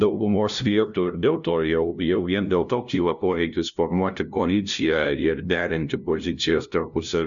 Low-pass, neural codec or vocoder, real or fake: 7.2 kHz; codec, 16 kHz, 0.5 kbps, FunCodec, trained on LibriTTS, 25 frames a second; fake